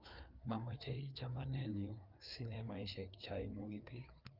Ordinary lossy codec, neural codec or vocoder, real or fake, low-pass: Opus, 24 kbps; codec, 16 kHz, 4 kbps, FreqCodec, larger model; fake; 5.4 kHz